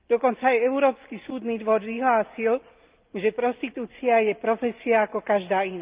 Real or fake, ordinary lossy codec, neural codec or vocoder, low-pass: real; Opus, 32 kbps; none; 3.6 kHz